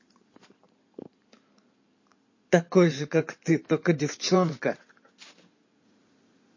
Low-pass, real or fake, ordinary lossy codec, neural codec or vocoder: 7.2 kHz; fake; MP3, 32 kbps; codec, 16 kHz in and 24 kHz out, 2.2 kbps, FireRedTTS-2 codec